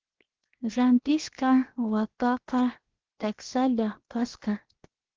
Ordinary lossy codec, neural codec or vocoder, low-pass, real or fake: Opus, 16 kbps; codec, 16 kHz, 0.7 kbps, FocalCodec; 7.2 kHz; fake